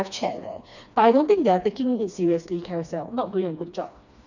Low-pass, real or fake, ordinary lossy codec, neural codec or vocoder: 7.2 kHz; fake; none; codec, 16 kHz, 2 kbps, FreqCodec, smaller model